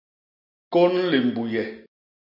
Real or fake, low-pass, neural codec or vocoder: real; 5.4 kHz; none